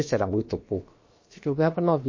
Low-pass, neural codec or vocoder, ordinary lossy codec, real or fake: 7.2 kHz; codec, 16 kHz, about 1 kbps, DyCAST, with the encoder's durations; MP3, 32 kbps; fake